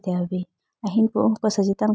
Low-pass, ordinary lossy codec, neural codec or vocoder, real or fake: none; none; none; real